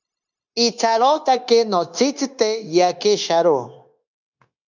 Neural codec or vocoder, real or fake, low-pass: codec, 16 kHz, 0.9 kbps, LongCat-Audio-Codec; fake; 7.2 kHz